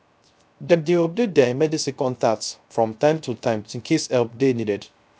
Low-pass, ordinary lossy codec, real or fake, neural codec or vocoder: none; none; fake; codec, 16 kHz, 0.3 kbps, FocalCodec